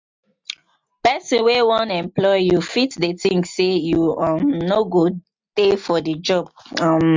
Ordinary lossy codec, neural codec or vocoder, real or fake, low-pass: MP3, 64 kbps; none; real; 7.2 kHz